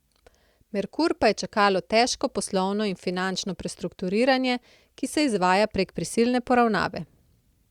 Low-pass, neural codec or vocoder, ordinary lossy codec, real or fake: 19.8 kHz; none; Opus, 64 kbps; real